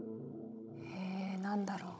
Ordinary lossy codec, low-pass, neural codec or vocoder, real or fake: none; none; codec, 16 kHz, 16 kbps, FunCodec, trained on Chinese and English, 50 frames a second; fake